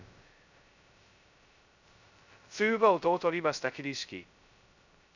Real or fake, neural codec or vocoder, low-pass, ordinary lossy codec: fake; codec, 16 kHz, 0.2 kbps, FocalCodec; 7.2 kHz; none